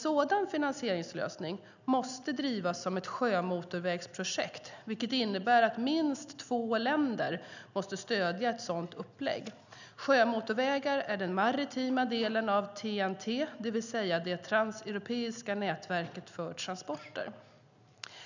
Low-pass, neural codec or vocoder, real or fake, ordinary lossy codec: 7.2 kHz; none; real; none